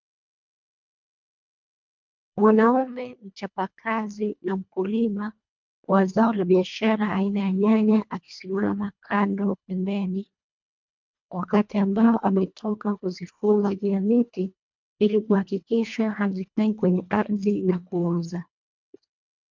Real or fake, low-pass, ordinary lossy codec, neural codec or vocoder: fake; 7.2 kHz; MP3, 64 kbps; codec, 24 kHz, 1.5 kbps, HILCodec